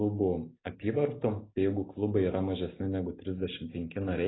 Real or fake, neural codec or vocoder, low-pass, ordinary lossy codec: real; none; 7.2 kHz; AAC, 16 kbps